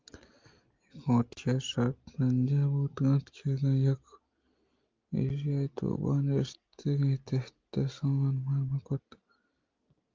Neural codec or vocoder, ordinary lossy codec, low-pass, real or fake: none; Opus, 24 kbps; 7.2 kHz; real